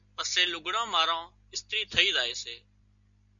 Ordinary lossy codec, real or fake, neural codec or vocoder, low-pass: MP3, 64 kbps; real; none; 7.2 kHz